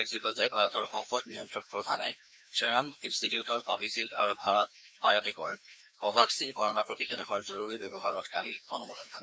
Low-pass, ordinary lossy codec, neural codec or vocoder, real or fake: none; none; codec, 16 kHz, 1 kbps, FreqCodec, larger model; fake